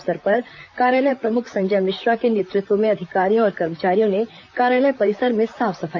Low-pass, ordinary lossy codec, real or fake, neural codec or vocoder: 7.2 kHz; none; fake; vocoder, 44.1 kHz, 128 mel bands, Pupu-Vocoder